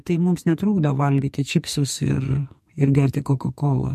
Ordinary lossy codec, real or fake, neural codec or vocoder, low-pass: MP3, 64 kbps; fake; codec, 44.1 kHz, 2.6 kbps, SNAC; 14.4 kHz